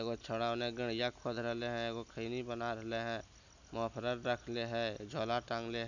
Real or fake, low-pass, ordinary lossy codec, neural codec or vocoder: real; 7.2 kHz; none; none